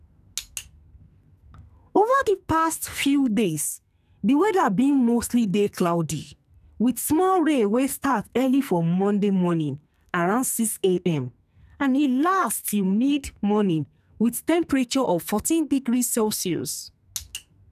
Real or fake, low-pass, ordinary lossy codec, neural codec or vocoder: fake; 14.4 kHz; none; codec, 44.1 kHz, 2.6 kbps, SNAC